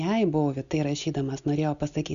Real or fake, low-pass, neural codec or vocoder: real; 7.2 kHz; none